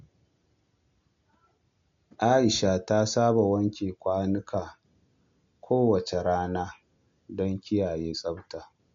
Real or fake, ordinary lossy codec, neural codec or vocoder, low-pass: real; MP3, 48 kbps; none; 7.2 kHz